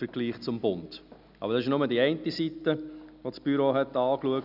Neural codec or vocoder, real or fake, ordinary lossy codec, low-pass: none; real; none; 5.4 kHz